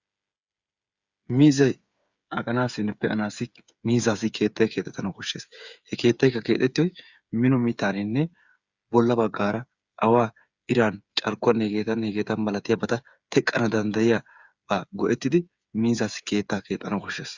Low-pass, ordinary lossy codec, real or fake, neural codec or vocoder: 7.2 kHz; Opus, 64 kbps; fake; codec, 16 kHz, 8 kbps, FreqCodec, smaller model